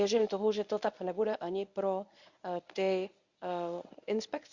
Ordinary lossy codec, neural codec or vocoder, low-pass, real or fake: Opus, 64 kbps; codec, 24 kHz, 0.9 kbps, WavTokenizer, medium speech release version 1; 7.2 kHz; fake